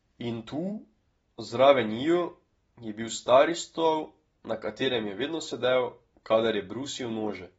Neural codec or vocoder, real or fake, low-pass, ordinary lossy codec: none; real; 19.8 kHz; AAC, 24 kbps